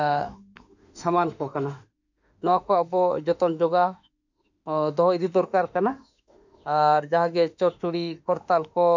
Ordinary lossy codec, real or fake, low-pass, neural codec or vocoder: none; fake; 7.2 kHz; autoencoder, 48 kHz, 32 numbers a frame, DAC-VAE, trained on Japanese speech